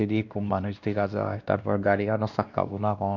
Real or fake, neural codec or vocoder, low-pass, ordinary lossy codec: fake; codec, 16 kHz, 1 kbps, X-Codec, WavLM features, trained on Multilingual LibriSpeech; 7.2 kHz; none